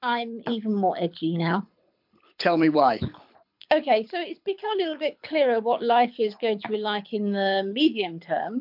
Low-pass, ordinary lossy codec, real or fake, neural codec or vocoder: 5.4 kHz; MP3, 48 kbps; fake; codec, 24 kHz, 6 kbps, HILCodec